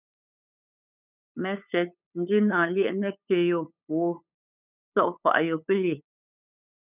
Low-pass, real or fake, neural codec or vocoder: 3.6 kHz; fake; codec, 16 kHz, 8 kbps, FunCodec, trained on LibriTTS, 25 frames a second